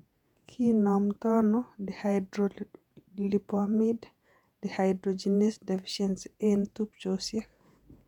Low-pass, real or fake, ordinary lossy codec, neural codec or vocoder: 19.8 kHz; fake; none; vocoder, 48 kHz, 128 mel bands, Vocos